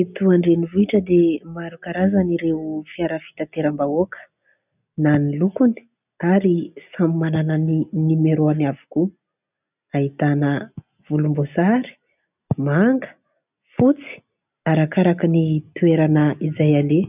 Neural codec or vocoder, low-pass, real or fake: none; 3.6 kHz; real